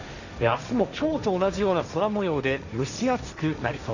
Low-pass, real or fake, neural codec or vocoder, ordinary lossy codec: 7.2 kHz; fake; codec, 16 kHz, 1.1 kbps, Voila-Tokenizer; none